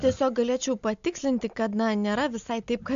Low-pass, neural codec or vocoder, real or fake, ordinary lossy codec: 7.2 kHz; none; real; AAC, 64 kbps